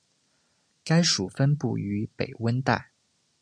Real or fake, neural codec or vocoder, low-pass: real; none; 9.9 kHz